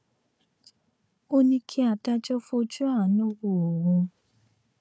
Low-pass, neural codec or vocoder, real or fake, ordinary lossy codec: none; codec, 16 kHz, 4 kbps, FunCodec, trained on Chinese and English, 50 frames a second; fake; none